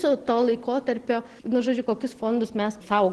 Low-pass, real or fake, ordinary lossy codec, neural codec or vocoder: 10.8 kHz; real; Opus, 16 kbps; none